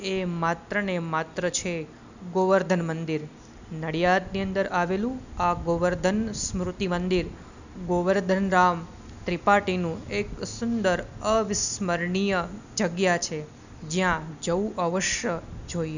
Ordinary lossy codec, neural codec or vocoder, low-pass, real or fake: none; none; 7.2 kHz; real